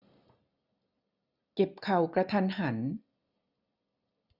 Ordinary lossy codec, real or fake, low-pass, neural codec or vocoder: none; real; 5.4 kHz; none